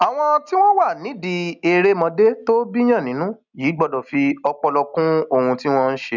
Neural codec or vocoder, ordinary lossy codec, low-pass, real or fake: none; none; 7.2 kHz; real